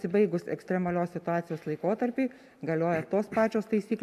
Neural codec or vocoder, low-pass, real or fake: none; 14.4 kHz; real